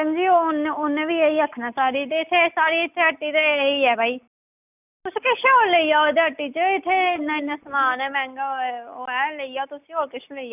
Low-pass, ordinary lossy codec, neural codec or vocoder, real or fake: 3.6 kHz; none; none; real